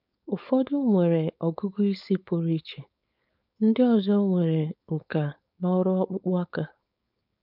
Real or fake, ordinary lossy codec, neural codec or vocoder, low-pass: fake; none; codec, 16 kHz, 4.8 kbps, FACodec; 5.4 kHz